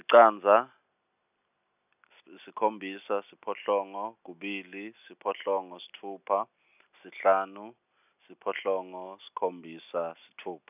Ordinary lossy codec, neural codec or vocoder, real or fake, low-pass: none; none; real; 3.6 kHz